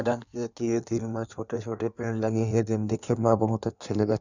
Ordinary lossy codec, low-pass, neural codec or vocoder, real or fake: none; 7.2 kHz; codec, 16 kHz in and 24 kHz out, 1.1 kbps, FireRedTTS-2 codec; fake